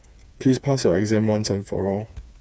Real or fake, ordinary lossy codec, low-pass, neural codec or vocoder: fake; none; none; codec, 16 kHz, 4 kbps, FreqCodec, smaller model